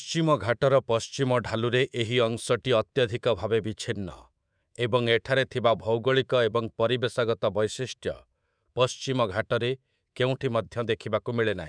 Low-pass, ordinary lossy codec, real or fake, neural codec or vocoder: 9.9 kHz; none; fake; codec, 24 kHz, 3.1 kbps, DualCodec